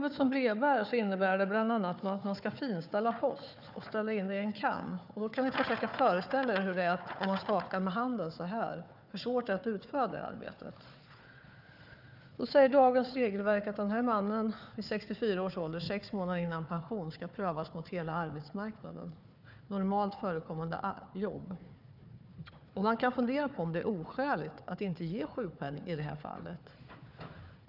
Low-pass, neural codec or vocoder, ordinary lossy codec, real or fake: 5.4 kHz; codec, 16 kHz, 4 kbps, FunCodec, trained on Chinese and English, 50 frames a second; none; fake